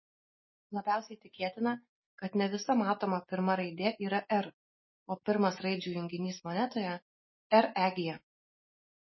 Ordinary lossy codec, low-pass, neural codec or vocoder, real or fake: MP3, 24 kbps; 7.2 kHz; none; real